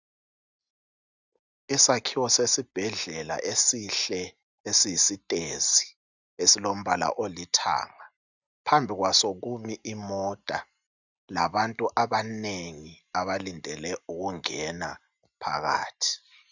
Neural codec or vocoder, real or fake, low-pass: none; real; 7.2 kHz